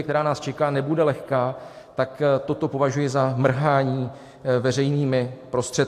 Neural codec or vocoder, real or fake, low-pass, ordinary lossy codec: none; real; 14.4 kHz; AAC, 64 kbps